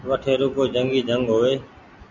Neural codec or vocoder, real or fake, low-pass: none; real; 7.2 kHz